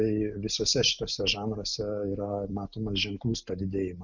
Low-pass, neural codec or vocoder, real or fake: 7.2 kHz; none; real